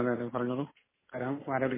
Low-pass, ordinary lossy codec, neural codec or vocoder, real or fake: 3.6 kHz; MP3, 16 kbps; none; real